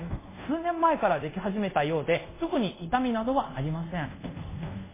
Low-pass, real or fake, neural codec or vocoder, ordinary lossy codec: 3.6 kHz; fake; codec, 24 kHz, 0.5 kbps, DualCodec; MP3, 16 kbps